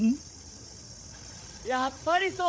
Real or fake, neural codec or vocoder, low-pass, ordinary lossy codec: fake; codec, 16 kHz, 8 kbps, FreqCodec, larger model; none; none